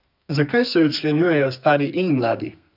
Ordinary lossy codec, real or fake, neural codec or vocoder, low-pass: none; fake; codec, 32 kHz, 1.9 kbps, SNAC; 5.4 kHz